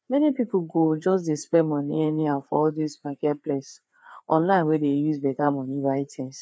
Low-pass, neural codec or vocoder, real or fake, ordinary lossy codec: none; codec, 16 kHz, 4 kbps, FreqCodec, larger model; fake; none